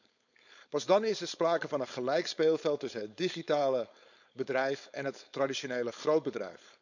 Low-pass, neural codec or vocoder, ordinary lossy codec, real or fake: 7.2 kHz; codec, 16 kHz, 4.8 kbps, FACodec; none; fake